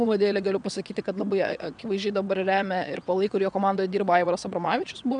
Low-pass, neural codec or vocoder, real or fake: 9.9 kHz; vocoder, 22.05 kHz, 80 mel bands, WaveNeXt; fake